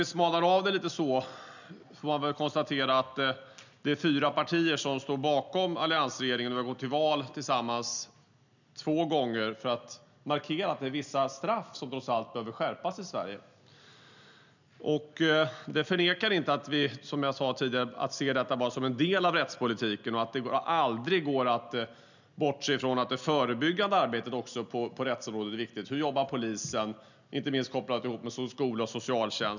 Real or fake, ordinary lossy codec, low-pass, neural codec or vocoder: real; none; 7.2 kHz; none